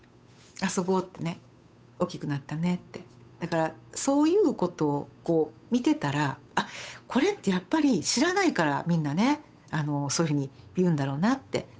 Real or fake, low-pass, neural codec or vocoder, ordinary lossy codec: fake; none; codec, 16 kHz, 8 kbps, FunCodec, trained on Chinese and English, 25 frames a second; none